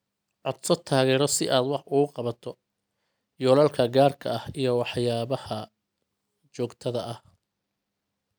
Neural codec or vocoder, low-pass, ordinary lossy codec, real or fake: none; none; none; real